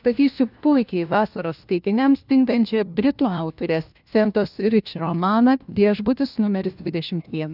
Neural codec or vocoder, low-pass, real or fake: codec, 16 kHz, 1 kbps, FunCodec, trained on LibriTTS, 50 frames a second; 5.4 kHz; fake